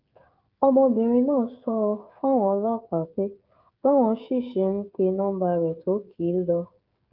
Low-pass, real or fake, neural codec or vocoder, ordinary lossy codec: 5.4 kHz; fake; codec, 16 kHz, 16 kbps, FreqCodec, smaller model; Opus, 32 kbps